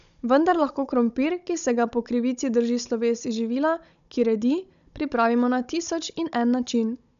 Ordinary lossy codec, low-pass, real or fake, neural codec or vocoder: none; 7.2 kHz; fake; codec, 16 kHz, 16 kbps, FunCodec, trained on Chinese and English, 50 frames a second